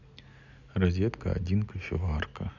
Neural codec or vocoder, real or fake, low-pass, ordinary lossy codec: none; real; 7.2 kHz; none